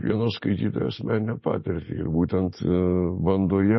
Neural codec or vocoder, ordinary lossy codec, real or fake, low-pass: none; MP3, 24 kbps; real; 7.2 kHz